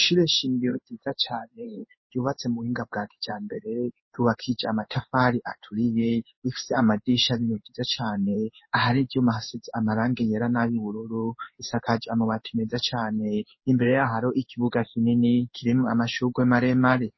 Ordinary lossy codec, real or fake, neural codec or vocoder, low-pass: MP3, 24 kbps; fake; codec, 16 kHz in and 24 kHz out, 1 kbps, XY-Tokenizer; 7.2 kHz